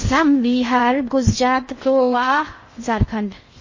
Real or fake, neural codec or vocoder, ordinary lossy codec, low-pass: fake; codec, 16 kHz in and 24 kHz out, 0.6 kbps, FocalCodec, streaming, 2048 codes; MP3, 32 kbps; 7.2 kHz